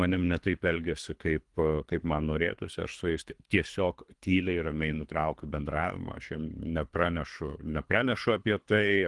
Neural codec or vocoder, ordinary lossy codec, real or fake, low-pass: codec, 24 kHz, 3 kbps, HILCodec; Opus, 24 kbps; fake; 10.8 kHz